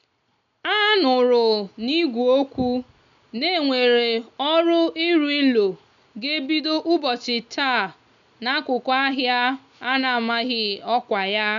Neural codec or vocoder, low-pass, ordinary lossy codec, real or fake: none; 7.2 kHz; none; real